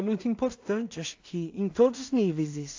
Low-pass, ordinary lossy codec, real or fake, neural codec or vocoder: 7.2 kHz; AAC, 48 kbps; fake; codec, 16 kHz in and 24 kHz out, 0.4 kbps, LongCat-Audio-Codec, two codebook decoder